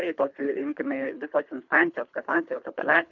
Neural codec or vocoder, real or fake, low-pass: codec, 24 kHz, 3 kbps, HILCodec; fake; 7.2 kHz